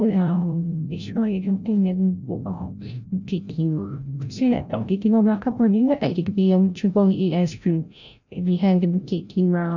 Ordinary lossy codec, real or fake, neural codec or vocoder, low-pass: none; fake; codec, 16 kHz, 0.5 kbps, FreqCodec, larger model; 7.2 kHz